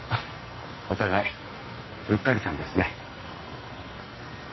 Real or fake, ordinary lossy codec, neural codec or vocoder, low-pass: fake; MP3, 24 kbps; codec, 44.1 kHz, 2.6 kbps, SNAC; 7.2 kHz